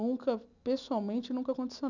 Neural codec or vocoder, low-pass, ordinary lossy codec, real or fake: none; 7.2 kHz; none; real